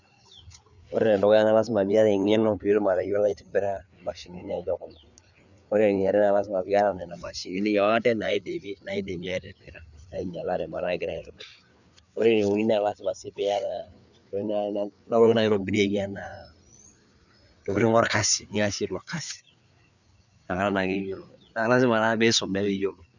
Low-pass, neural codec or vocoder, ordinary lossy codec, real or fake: 7.2 kHz; codec, 16 kHz, 4 kbps, FreqCodec, larger model; none; fake